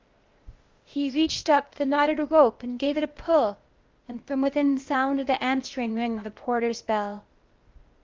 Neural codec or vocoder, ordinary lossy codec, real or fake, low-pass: codec, 16 kHz, 0.8 kbps, ZipCodec; Opus, 32 kbps; fake; 7.2 kHz